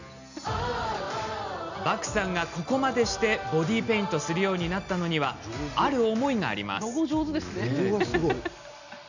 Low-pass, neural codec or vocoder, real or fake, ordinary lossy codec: 7.2 kHz; none; real; none